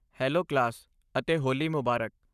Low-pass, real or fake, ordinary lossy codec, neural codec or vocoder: 14.4 kHz; fake; none; codec, 44.1 kHz, 7.8 kbps, Pupu-Codec